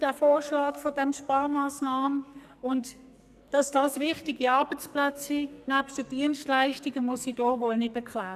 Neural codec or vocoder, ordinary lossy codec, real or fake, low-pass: codec, 44.1 kHz, 2.6 kbps, SNAC; none; fake; 14.4 kHz